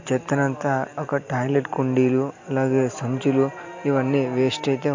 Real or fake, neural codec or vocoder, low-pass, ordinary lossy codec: real; none; 7.2 kHz; MP3, 48 kbps